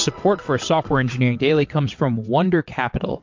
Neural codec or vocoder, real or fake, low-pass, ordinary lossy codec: vocoder, 22.05 kHz, 80 mel bands, WaveNeXt; fake; 7.2 kHz; MP3, 48 kbps